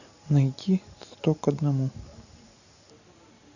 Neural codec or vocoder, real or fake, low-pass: none; real; 7.2 kHz